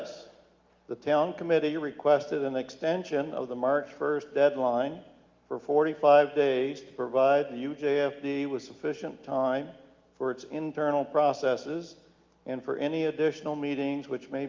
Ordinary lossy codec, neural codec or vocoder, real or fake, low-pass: Opus, 24 kbps; none; real; 7.2 kHz